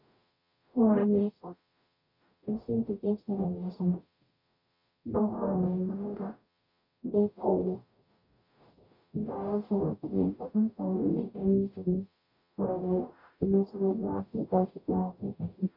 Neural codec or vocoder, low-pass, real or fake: codec, 44.1 kHz, 0.9 kbps, DAC; 5.4 kHz; fake